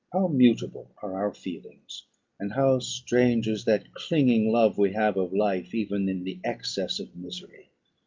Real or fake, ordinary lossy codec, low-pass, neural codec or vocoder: real; Opus, 24 kbps; 7.2 kHz; none